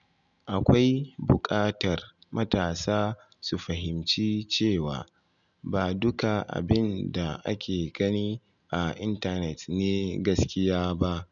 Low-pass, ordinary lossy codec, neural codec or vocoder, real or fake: 7.2 kHz; none; none; real